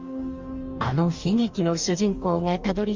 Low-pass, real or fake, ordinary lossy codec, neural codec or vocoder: 7.2 kHz; fake; Opus, 32 kbps; codec, 44.1 kHz, 2.6 kbps, DAC